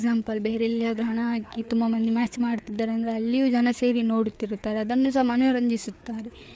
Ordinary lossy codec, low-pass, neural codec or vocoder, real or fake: none; none; codec, 16 kHz, 4 kbps, FreqCodec, larger model; fake